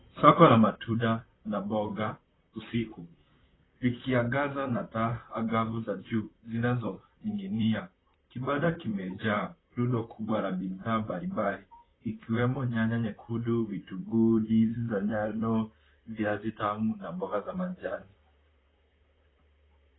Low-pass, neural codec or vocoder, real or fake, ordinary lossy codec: 7.2 kHz; vocoder, 44.1 kHz, 128 mel bands, Pupu-Vocoder; fake; AAC, 16 kbps